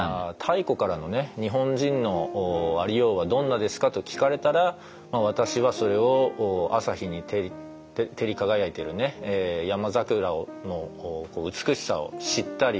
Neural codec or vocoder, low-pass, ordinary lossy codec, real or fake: none; none; none; real